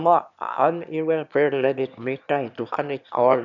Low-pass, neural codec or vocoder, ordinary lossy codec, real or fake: 7.2 kHz; autoencoder, 22.05 kHz, a latent of 192 numbers a frame, VITS, trained on one speaker; none; fake